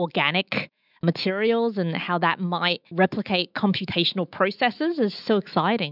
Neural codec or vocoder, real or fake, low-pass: autoencoder, 48 kHz, 128 numbers a frame, DAC-VAE, trained on Japanese speech; fake; 5.4 kHz